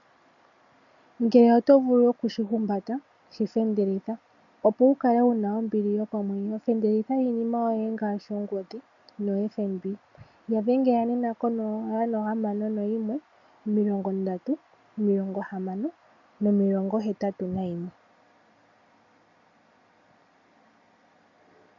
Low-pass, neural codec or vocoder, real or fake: 7.2 kHz; none; real